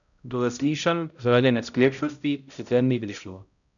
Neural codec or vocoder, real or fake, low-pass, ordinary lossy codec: codec, 16 kHz, 0.5 kbps, X-Codec, HuBERT features, trained on balanced general audio; fake; 7.2 kHz; none